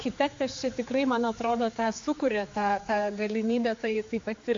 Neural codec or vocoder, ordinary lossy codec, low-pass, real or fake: codec, 16 kHz, 4 kbps, X-Codec, HuBERT features, trained on general audio; AAC, 48 kbps; 7.2 kHz; fake